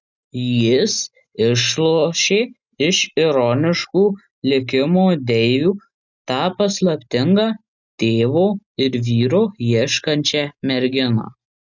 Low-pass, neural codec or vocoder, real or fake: 7.2 kHz; none; real